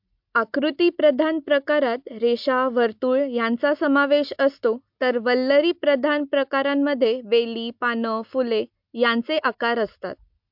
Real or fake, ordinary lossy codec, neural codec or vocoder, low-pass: real; MP3, 48 kbps; none; 5.4 kHz